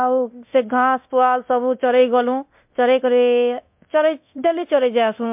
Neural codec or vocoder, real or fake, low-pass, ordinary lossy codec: codec, 24 kHz, 0.9 kbps, DualCodec; fake; 3.6 kHz; MP3, 32 kbps